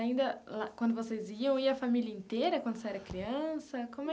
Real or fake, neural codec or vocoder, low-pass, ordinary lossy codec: real; none; none; none